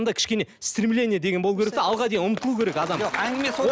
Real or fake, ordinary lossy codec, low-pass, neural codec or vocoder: real; none; none; none